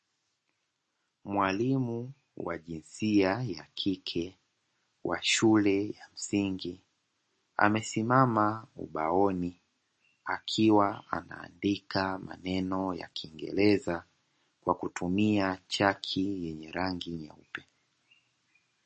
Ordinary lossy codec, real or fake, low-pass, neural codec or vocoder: MP3, 32 kbps; real; 9.9 kHz; none